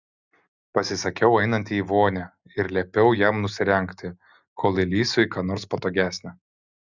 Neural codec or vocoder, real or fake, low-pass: none; real; 7.2 kHz